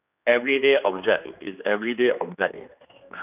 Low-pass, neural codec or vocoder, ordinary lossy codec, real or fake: 3.6 kHz; codec, 16 kHz, 2 kbps, X-Codec, HuBERT features, trained on general audio; none; fake